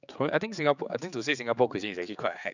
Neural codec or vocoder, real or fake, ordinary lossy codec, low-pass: codec, 16 kHz, 4 kbps, X-Codec, HuBERT features, trained on general audio; fake; none; 7.2 kHz